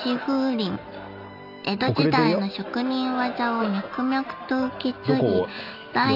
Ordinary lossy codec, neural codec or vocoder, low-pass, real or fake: AAC, 48 kbps; none; 5.4 kHz; real